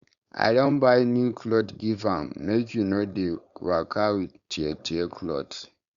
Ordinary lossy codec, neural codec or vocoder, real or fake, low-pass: Opus, 64 kbps; codec, 16 kHz, 4.8 kbps, FACodec; fake; 7.2 kHz